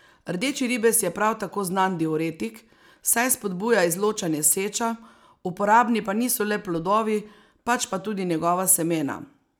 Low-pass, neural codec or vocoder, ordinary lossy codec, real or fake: none; none; none; real